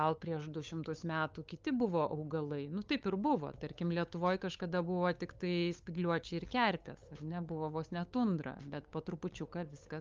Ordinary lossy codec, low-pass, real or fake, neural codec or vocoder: Opus, 24 kbps; 7.2 kHz; fake; codec, 16 kHz, 8 kbps, FunCodec, trained on LibriTTS, 25 frames a second